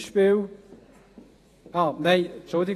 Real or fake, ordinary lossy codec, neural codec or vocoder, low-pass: fake; AAC, 48 kbps; vocoder, 48 kHz, 128 mel bands, Vocos; 14.4 kHz